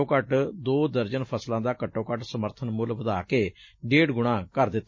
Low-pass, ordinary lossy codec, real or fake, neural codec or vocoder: 7.2 kHz; MP3, 32 kbps; real; none